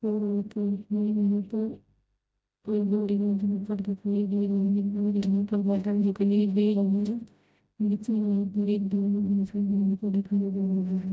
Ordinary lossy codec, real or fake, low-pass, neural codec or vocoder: none; fake; none; codec, 16 kHz, 0.5 kbps, FreqCodec, smaller model